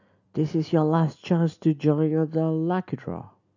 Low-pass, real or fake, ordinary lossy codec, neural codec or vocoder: 7.2 kHz; real; none; none